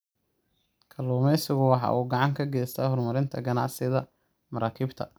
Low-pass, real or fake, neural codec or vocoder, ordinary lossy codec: none; real; none; none